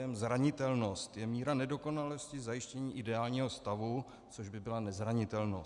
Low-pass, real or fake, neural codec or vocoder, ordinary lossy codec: 10.8 kHz; real; none; Opus, 64 kbps